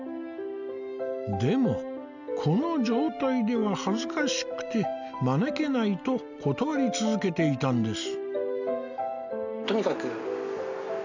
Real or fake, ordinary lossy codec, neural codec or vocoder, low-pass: real; none; none; 7.2 kHz